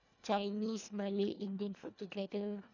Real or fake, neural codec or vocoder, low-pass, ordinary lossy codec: fake; codec, 24 kHz, 1.5 kbps, HILCodec; 7.2 kHz; none